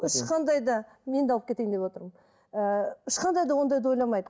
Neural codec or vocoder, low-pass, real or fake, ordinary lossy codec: none; none; real; none